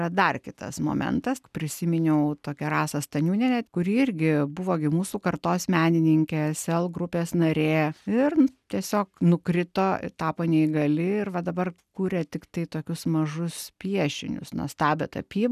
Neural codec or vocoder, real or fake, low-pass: none; real; 14.4 kHz